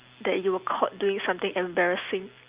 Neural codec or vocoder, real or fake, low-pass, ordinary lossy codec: none; real; 3.6 kHz; Opus, 24 kbps